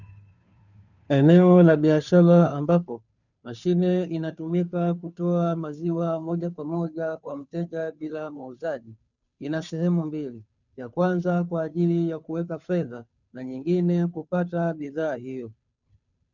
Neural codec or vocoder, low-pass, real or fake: codec, 16 kHz, 2 kbps, FunCodec, trained on Chinese and English, 25 frames a second; 7.2 kHz; fake